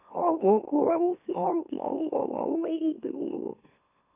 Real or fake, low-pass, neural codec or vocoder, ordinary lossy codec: fake; 3.6 kHz; autoencoder, 44.1 kHz, a latent of 192 numbers a frame, MeloTTS; none